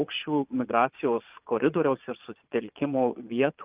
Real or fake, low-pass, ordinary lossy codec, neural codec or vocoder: fake; 3.6 kHz; Opus, 24 kbps; vocoder, 22.05 kHz, 80 mel bands, Vocos